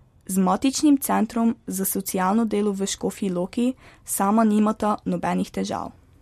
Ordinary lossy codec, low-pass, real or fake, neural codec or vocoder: MP3, 64 kbps; 14.4 kHz; real; none